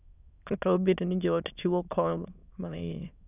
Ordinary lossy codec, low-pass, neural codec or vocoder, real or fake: none; 3.6 kHz; autoencoder, 22.05 kHz, a latent of 192 numbers a frame, VITS, trained on many speakers; fake